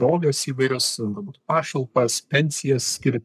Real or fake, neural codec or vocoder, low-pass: fake; codec, 44.1 kHz, 3.4 kbps, Pupu-Codec; 14.4 kHz